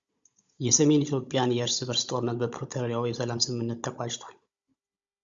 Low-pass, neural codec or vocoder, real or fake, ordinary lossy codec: 7.2 kHz; codec, 16 kHz, 16 kbps, FunCodec, trained on Chinese and English, 50 frames a second; fake; Opus, 64 kbps